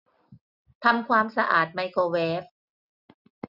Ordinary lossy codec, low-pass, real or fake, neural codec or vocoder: none; 5.4 kHz; real; none